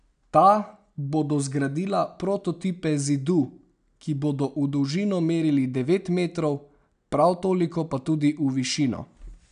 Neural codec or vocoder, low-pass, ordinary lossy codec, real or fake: none; 9.9 kHz; none; real